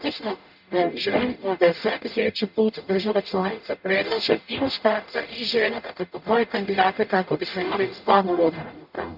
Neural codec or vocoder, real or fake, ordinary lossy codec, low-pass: codec, 44.1 kHz, 0.9 kbps, DAC; fake; none; 5.4 kHz